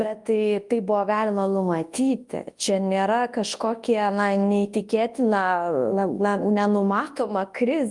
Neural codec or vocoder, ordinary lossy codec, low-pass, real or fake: codec, 24 kHz, 0.9 kbps, WavTokenizer, large speech release; Opus, 32 kbps; 10.8 kHz; fake